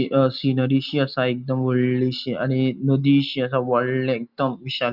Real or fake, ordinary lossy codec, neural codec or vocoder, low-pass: real; none; none; 5.4 kHz